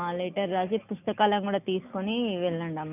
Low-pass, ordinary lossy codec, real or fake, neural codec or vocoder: 3.6 kHz; none; real; none